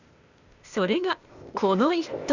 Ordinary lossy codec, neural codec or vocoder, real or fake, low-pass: Opus, 64 kbps; codec, 16 kHz, 0.8 kbps, ZipCodec; fake; 7.2 kHz